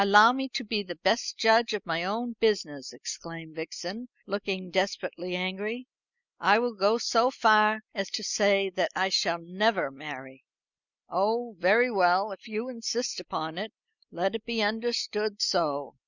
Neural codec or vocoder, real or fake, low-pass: none; real; 7.2 kHz